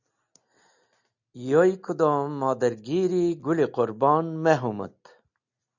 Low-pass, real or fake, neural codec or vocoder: 7.2 kHz; real; none